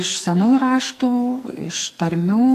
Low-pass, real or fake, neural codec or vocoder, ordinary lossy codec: 14.4 kHz; fake; codec, 44.1 kHz, 2.6 kbps, SNAC; AAC, 64 kbps